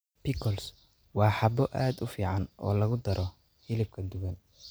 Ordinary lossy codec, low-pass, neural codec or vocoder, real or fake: none; none; none; real